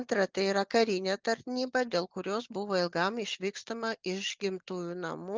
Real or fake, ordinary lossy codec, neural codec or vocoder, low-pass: real; Opus, 32 kbps; none; 7.2 kHz